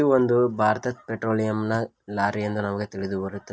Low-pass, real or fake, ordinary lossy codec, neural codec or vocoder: none; real; none; none